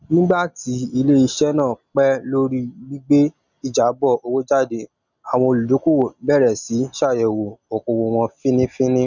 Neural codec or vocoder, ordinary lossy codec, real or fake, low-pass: none; none; real; 7.2 kHz